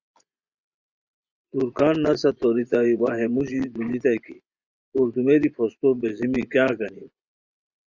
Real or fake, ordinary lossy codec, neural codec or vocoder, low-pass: fake; Opus, 64 kbps; vocoder, 24 kHz, 100 mel bands, Vocos; 7.2 kHz